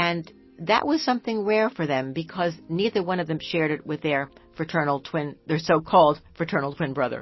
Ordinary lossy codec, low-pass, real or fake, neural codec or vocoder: MP3, 24 kbps; 7.2 kHz; real; none